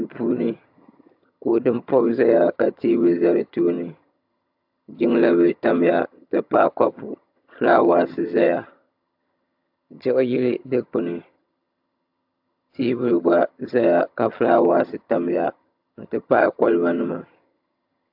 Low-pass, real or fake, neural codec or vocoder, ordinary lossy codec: 5.4 kHz; fake; vocoder, 22.05 kHz, 80 mel bands, HiFi-GAN; AAC, 48 kbps